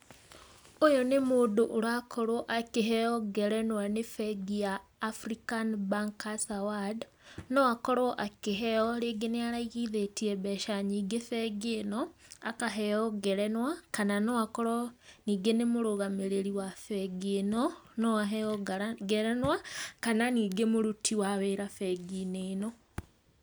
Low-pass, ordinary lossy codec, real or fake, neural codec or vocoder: none; none; real; none